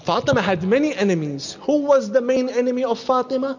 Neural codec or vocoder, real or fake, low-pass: none; real; 7.2 kHz